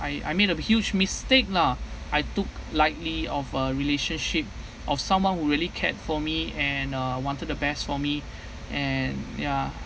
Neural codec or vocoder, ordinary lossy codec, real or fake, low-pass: none; none; real; none